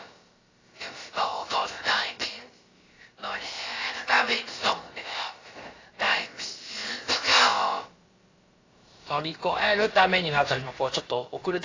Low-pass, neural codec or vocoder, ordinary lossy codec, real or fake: 7.2 kHz; codec, 16 kHz, about 1 kbps, DyCAST, with the encoder's durations; AAC, 32 kbps; fake